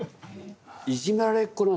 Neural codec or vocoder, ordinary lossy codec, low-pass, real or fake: none; none; none; real